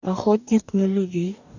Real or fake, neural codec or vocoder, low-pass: fake; codec, 44.1 kHz, 2.6 kbps, DAC; 7.2 kHz